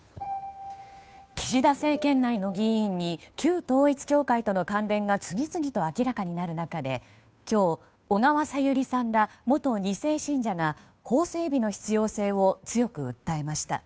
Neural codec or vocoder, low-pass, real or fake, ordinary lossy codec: codec, 16 kHz, 2 kbps, FunCodec, trained on Chinese and English, 25 frames a second; none; fake; none